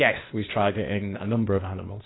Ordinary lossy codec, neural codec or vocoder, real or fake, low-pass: AAC, 16 kbps; codec, 16 kHz, 0.8 kbps, ZipCodec; fake; 7.2 kHz